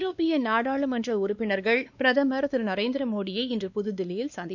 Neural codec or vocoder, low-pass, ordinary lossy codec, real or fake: codec, 16 kHz, 2 kbps, X-Codec, WavLM features, trained on Multilingual LibriSpeech; 7.2 kHz; none; fake